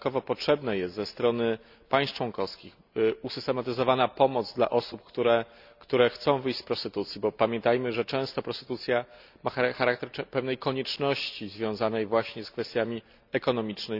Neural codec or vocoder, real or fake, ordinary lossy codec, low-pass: none; real; none; 5.4 kHz